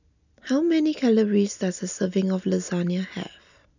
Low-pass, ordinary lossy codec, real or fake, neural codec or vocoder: 7.2 kHz; none; real; none